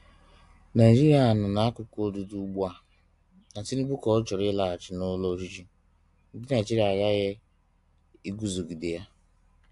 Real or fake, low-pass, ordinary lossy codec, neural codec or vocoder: real; 10.8 kHz; AAC, 64 kbps; none